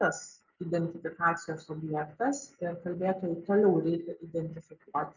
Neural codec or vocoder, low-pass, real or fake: none; 7.2 kHz; real